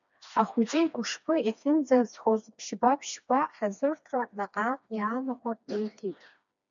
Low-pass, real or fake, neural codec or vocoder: 7.2 kHz; fake; codec, 16 kHz, 2 kbps, FreqCodec, smaller model